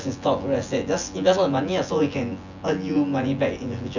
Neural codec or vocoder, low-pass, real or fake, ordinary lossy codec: vocoder, 24 kHz, 100 mel bands, Vocos; 7.2 kHz; fake; none